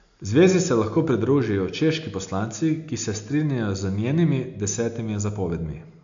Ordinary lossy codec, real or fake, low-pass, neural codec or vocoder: AAC, 64 kbps; real; 7.2 kHz; none